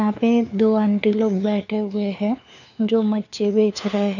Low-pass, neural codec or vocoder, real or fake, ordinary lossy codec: 7.2 kHz; codec, 16 kHz, 4 kbps, FunCodec, trained on LibriTTS, 50 frames a second; fake; none